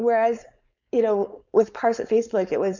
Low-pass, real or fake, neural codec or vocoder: 7.2 kHz; fake; codec, 16 kHz, 4.8 kbps, FACodec